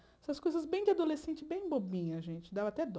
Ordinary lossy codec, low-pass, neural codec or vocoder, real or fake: none; none; none; real